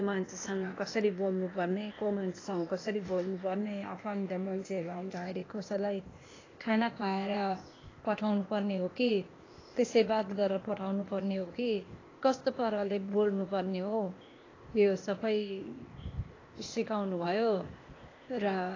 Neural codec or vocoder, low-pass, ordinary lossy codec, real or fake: codec, 16 kHz, 0.8 kbps, ZipCodec; 7.2 kHz; AAC, 32 kbps; fake